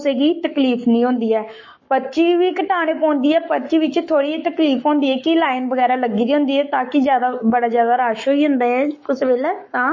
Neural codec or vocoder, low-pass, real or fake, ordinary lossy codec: codec, 44.1 kHz, 7.8 kbps, Pupu-Codec; 7.2 kHz; fake; MP3, 32 kbps